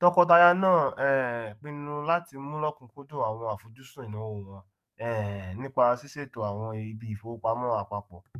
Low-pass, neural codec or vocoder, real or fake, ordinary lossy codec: 14.4 kHz; codec, 44.1 kHz, 7.8 kbps, DAC; fake; MP3, 96 kbps